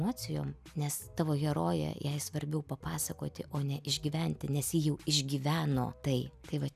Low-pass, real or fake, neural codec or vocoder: 14.4 kHz; real; none